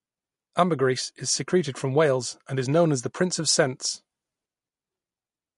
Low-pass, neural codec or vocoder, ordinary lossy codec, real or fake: 10.8 kHz; none; MP3, 48 kbps; real